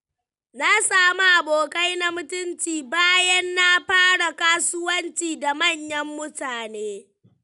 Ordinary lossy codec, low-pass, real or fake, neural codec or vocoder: none; 10.8 kHz; real; none